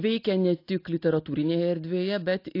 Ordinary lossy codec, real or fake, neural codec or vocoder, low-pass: AAC, 32 kbps; real; none; 5.4 kHz